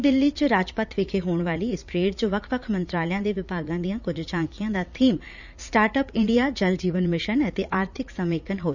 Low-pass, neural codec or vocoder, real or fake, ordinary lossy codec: 7.2 kHz; vocoder, 44.1 kHz, 80 mel bands, Vocos; fake; none